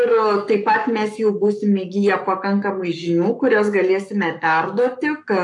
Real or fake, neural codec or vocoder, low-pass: fake; codec, 44.1 kHz, 7.8 kbps, Pupu-Codec; 10.8 kHz